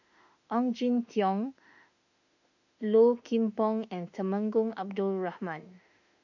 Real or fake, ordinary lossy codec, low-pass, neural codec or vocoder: fake; none; 7.2 kHz; autoencoder, 48 kHz, 32 numbers a frame, DAC-VAE, trained on Japanese speech